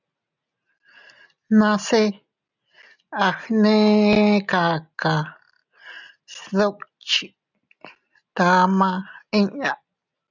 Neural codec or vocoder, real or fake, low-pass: none; real; 7.2 kHz